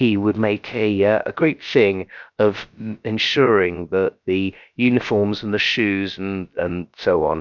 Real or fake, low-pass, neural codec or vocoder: fake; 7.2 kHz; codec, 16 kHz, about 1 kbps, DyCAST, with the encoder's durations